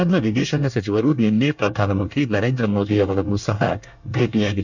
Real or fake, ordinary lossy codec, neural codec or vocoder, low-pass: fake; none; codec, 24 kHz, 1 kbps, SNAC; 7.2 kHz